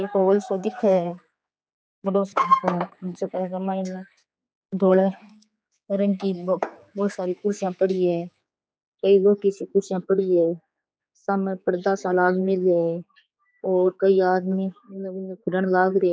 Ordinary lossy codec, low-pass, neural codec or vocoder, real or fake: none; none; codec, 16 kHz, 4 kbps, X-Codec, HuBERT features, trained on general audio; fake